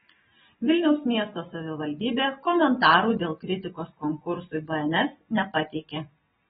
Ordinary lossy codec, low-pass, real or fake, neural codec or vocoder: AAC, 16 kbps; 19.8 kHz; real; none